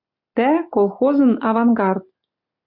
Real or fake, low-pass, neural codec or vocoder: real; 5.4 kHz; none